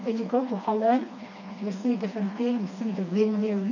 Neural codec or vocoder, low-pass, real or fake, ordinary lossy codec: codec, 16 kHz, 2 kbps, FreqCodec, smaller model; 7.2 kHz; fake; none